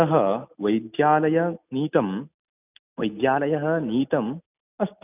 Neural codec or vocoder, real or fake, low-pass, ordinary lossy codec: none; real; 3.6 kHz; none